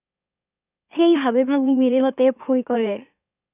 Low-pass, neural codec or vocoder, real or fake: 3.6 kHz; autoencoder, 44.1 kHz, a latent of 192 numbers a frame, MeloTTS; fake